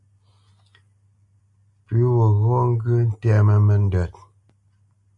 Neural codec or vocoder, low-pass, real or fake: none; 10.8 kHz; real